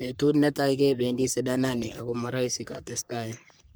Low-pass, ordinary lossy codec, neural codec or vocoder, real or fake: none; none; codec, 44.1 kHz, 3.4 kbps, Pupu-Codec; fake